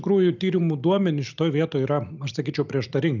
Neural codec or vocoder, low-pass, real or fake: none; 7.2 kHz; real